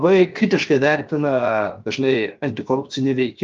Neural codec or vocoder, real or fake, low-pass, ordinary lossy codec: codec, 16 kHz, 0.7 kbps, FocalCodec; fake; 7.2 kHz; Opus, 32 kbps